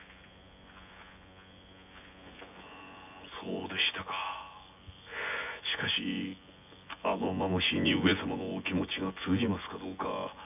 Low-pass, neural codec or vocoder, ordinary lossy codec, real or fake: 3.6 kHz; vocoder, 24 kHz, 100 mel bands, Vocos; AAC, 32 kbps; fake